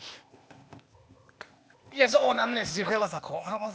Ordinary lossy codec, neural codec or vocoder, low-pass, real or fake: none; codec, 16 kHz, 0.8 kbps, ZipCodec; none; fake